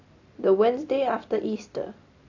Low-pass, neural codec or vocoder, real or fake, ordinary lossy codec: 7.2 kHz; none; real; AAC, 48 kbps